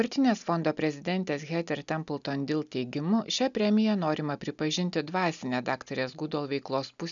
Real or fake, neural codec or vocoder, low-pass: real; none; 7.2 kHz